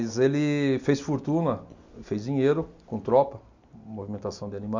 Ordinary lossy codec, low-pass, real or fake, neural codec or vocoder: MP3, 64 kbps; 7.2 kHz; real; none